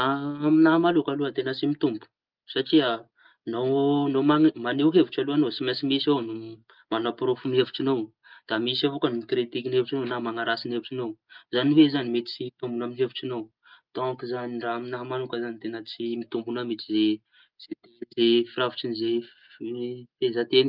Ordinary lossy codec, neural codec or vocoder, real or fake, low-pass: Opus, 32 kbps; none; real; 5.4 kHz